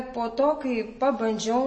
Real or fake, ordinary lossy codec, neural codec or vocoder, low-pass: real; MP3, 32 kbps; none; 9.9 kHz